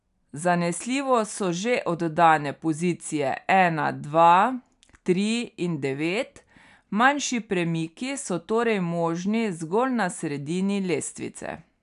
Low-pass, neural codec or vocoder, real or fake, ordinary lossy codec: 10.8 kHz; none; real; none